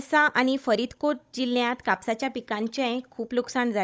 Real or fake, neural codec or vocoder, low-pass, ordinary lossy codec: fake; codec, 16 kHz, 16 kbps, FunCodec, trained on Chinese and English, 50 frames a second; none; none